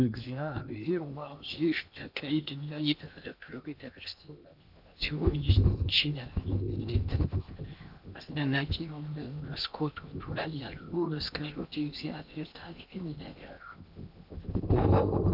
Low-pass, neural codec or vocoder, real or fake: 5.4 kHz; codec, 16 kHz in and 24 kHz out, 0.8 kbps, FocalCodec, streaming, 65536 codes; fake